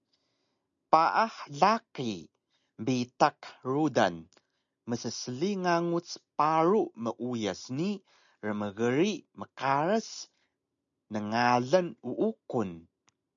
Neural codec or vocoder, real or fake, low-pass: none; real; 7.2 kHz